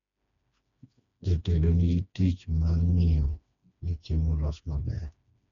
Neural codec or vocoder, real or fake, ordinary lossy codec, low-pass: codec, 16 kHz, 2 kbps, FreqCodec, smaller model; fake; none; 7.2 kHz